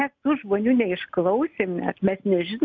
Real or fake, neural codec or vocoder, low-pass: real; none; 7.2 kHz